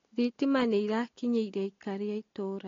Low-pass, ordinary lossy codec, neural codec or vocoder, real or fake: 7.2 kHz; AAC, 32 kbps; none; real